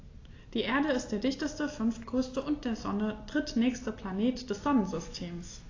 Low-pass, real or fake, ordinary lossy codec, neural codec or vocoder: 7.2 kHz; real; AAC, 32 kbps; none